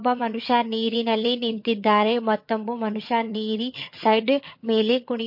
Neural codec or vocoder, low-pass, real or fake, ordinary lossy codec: vocoder, 22.05 kHz, 80 mel bands, HiFi-GAN; 5.4 kHz; fake; MP3, 32 kbps